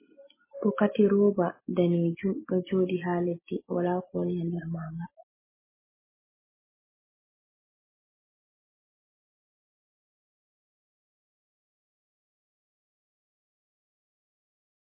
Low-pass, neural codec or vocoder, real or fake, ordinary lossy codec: 3.6 kHz; none; real; MP3, 16 kbps